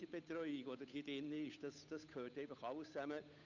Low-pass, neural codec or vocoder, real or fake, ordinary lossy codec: 7.2 kHz; codec, 16 kHz, 8 kbps, FunCodec, trained on Chinese and English, 25 frames a second; fake; none